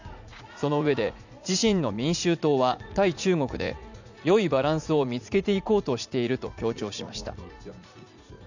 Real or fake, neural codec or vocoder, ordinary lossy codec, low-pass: fake; vocoder, 44.1 kHz, 80 mel bands, Vocos; none; 7.2 kHz